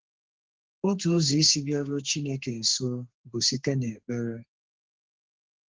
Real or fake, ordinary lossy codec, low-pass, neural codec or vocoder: fake; Opus, 16 kbps; 7.2 kHz; codec, 32 kHz, 1.9 kbps, SNAC